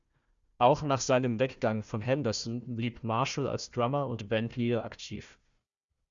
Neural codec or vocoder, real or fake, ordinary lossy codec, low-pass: codec, 16 kHz, 1 kbps, FunCodec, trained on Chinese and English, 50 frames a second; fake; Opus, 64 kbps; 7.2 kHz